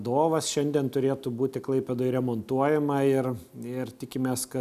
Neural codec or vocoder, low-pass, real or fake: none; 14.4 kHz; real